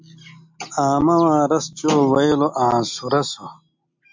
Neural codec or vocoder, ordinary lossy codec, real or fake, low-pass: none; MP3, 64 kbps; real; 7.2 kHz